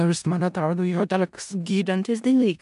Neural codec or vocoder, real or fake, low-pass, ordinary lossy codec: codec, 16 kHz in and 24 kHz out, 0.4 kbps, LongCat-Audio-Codec, four codebook decoder; fake; 10.8 kHz; AAC, 96 kbps